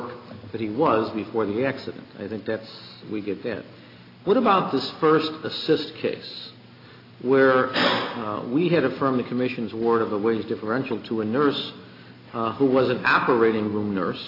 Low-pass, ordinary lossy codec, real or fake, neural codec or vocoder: 5.4 kHz; AAC, 32 kbps; real; none